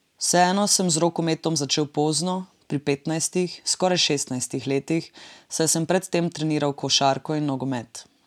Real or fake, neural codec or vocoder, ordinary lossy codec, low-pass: real; none; none; 19.8 kHz